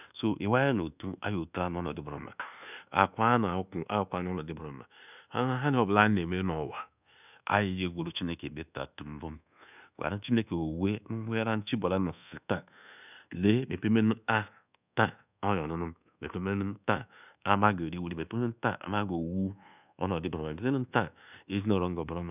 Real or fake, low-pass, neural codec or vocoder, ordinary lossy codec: fake; 3.6 kHz; codec, 24 kHz, 1.2 kbps, DualCodec; none